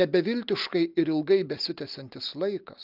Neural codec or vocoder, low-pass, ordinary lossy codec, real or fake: none; 5.4 kHz; Opus, 32 kbps; real